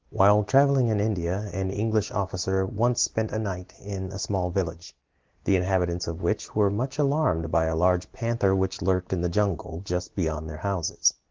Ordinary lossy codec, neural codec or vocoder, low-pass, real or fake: Opus, 16 kbps; none; 7.2 kHz; real